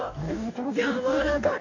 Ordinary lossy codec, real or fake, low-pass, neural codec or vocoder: none; fake; 7.2 kHz; codec, 44.1 kHz, 2.6 kbps, DAC